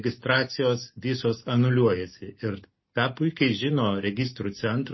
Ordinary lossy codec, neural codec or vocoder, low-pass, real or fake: MP3, 24 kbps; none; 7.2 kHz; real